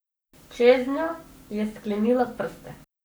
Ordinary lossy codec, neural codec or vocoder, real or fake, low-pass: none; codec, 44.1 kHz, 7.8 kbps, Pupu-Codec; fake; none